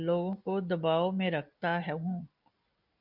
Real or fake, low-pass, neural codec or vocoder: real; 5.4 kHz; none